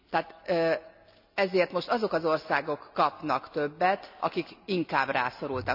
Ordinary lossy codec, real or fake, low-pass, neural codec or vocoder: none; real; 5.4 kHz; none